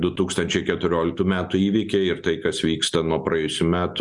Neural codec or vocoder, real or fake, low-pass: none; real; 10.8 kHz